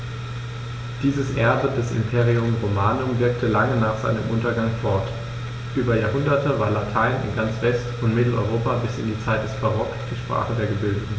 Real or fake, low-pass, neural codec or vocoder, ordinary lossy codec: real; none; none; none